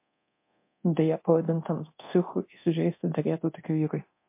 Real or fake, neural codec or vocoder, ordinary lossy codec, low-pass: fake; codec, 24 kHz, 0.9 kbps, DualCodec; MP3, 24 kbps; 3.6 kHz